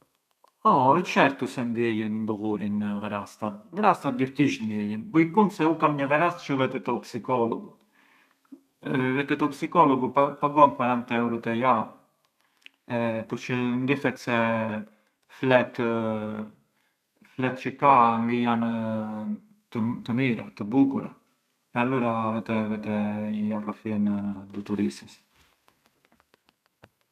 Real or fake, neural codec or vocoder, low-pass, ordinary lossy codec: fake; codec, 32 kHz, 1.9 kbps, SNAC; 14.4 kHz; none